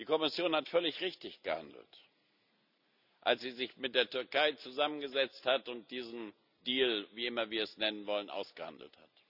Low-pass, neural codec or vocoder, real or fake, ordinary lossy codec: 5.4 kHz; none; real; none